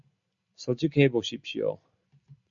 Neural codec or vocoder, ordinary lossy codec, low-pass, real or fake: none; MP3, 64 kbps; 7.2 kHz; real